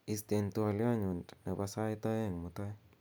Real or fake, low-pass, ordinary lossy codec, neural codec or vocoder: real; none; none; none